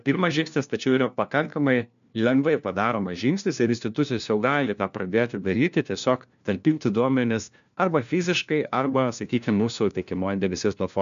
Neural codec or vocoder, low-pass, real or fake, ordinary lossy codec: codec, 16 kHz, 1 kbps, FunCodec, trained on LibriTTS, 50 frames a second; 7.2 kHz; fake; MP3, 64 kbps